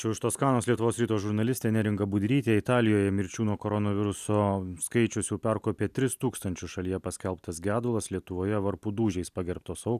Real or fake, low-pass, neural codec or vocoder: real; 14.4 kHz; none